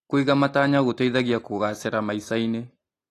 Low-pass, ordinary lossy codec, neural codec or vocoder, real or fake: 14.4 kHz; AAC, 48 kbps; none; real